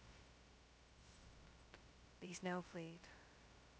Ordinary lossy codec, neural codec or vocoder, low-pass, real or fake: none; codec, 16 kHz, 0.2 kbps, FocalCodec; none; fake